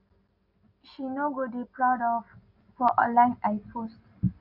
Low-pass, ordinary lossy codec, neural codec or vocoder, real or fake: 5.4 kHz; none; none; real